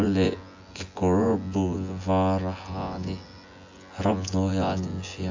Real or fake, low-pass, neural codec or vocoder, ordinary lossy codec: fake; 7.2 kHz; vocoder, 24 kHz, 100 mel bands, Vocos; AAC, 48 kbps